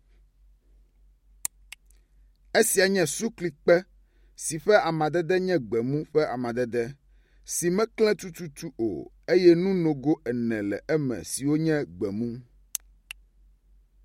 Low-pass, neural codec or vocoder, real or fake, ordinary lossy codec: 19.8 kHz; none; real; MP3, 64 kbps